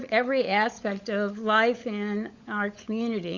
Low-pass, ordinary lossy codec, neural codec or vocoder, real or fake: 7.2 kHz; Opus, 64 kbps; codec, 16 kHz, 16 kbps, FunCodec, trained on LibriTTS, 50 frames a second; fake